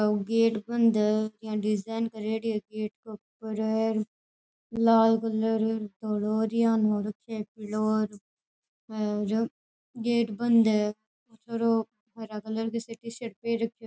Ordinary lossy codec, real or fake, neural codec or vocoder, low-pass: none; real; none; none